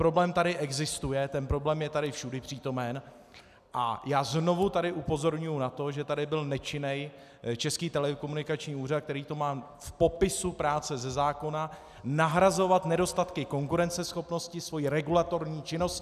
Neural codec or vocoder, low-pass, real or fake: none; 14.4 kHz; real